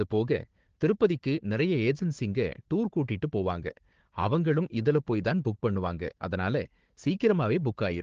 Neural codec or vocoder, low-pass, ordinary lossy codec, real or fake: codec, 16 kHz, 4 kbps, FunCodec, trained on Chinese and English, 50 frames a second; 7.2 kHz; Opus, 16 kbps; fake